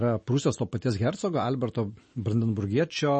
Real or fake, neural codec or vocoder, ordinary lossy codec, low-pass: real; none; MP3, 32 kbps; 10.8 kHz